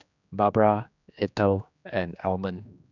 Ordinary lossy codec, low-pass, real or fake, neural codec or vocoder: none; 7.2 kHz; fake; codec, 16 kHz, 2 kbps, X-Codec, HuBERT features, trained on general audio